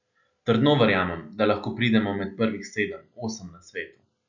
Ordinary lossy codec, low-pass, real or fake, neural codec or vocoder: none; 7.2 kHz; real; none